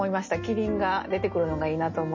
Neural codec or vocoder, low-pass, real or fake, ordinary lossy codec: none; 7.2 kHz; real; MP3, 32 kbps